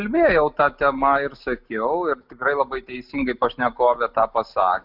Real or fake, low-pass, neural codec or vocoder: real; 5.4 kHz; none